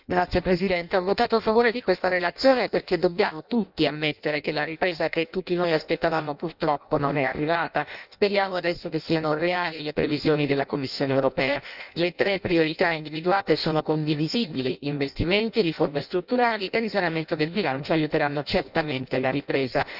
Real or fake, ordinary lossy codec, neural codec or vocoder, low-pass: fake; none; codec, 16 kHz in and 24 kHz out, 0.6 kbps, FireRedTTS-2 codec; 5.4 kHz